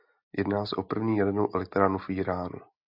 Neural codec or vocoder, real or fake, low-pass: none; real; 5.4 kHz